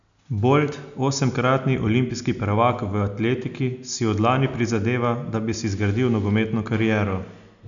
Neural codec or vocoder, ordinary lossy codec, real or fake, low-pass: none; none; real; 7.2 kHz